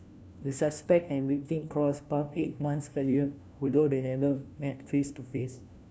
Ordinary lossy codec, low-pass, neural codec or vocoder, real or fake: none; none; codec, 16 kHz, 1 kbps, FunCodec, trained on LibriTTS, 50 frames a second; fake